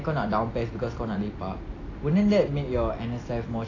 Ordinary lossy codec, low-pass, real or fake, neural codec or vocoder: AAC, 32 kbps; 7.2 kHz; real; none